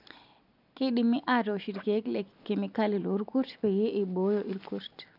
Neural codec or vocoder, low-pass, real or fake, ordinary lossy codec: none; 5.4 kHz; real; none